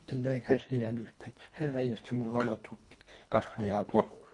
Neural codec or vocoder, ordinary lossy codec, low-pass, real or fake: codec, 24 kHz, 1.5 kbps, HILCodec; MP3, 64 kbps; 10.8 kHz; fake